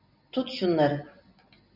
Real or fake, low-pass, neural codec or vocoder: real; 5.4 kHz; none